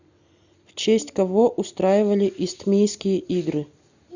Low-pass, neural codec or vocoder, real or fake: 7.2 kHz; none; real